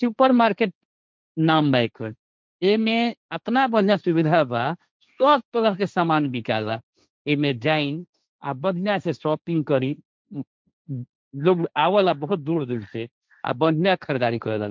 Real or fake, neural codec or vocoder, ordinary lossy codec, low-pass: fake; codec, 16 kHz, 1.1 kbps, Voila-Tokenizer; none; none